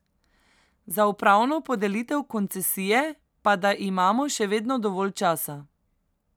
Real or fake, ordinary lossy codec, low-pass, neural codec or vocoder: real; none; none; none